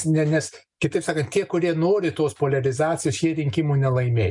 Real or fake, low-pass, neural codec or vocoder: real; 10.8 kHz; none